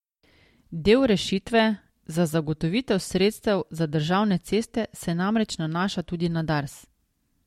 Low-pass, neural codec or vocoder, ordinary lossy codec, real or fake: 19.8 kHz; none; MP3, 64 kbps; real